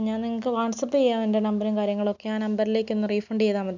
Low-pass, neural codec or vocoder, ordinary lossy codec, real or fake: 7.2 kHz; none; none; real